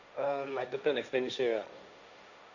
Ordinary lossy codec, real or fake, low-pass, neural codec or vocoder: none; fake; 7.2 kHz; codec, 16 kHz, 1.1 kbps, Voila-Tokenizer